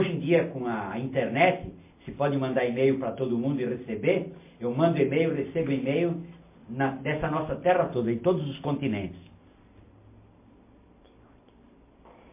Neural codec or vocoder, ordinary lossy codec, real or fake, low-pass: none; MP3, 24 kbps; real; 3.6 kHz